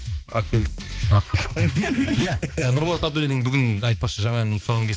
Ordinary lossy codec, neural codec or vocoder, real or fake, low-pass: none; codec, 16 kHz, 2 kbps, X-Codec, HuBERT features, trained on balanced general audio; fake; none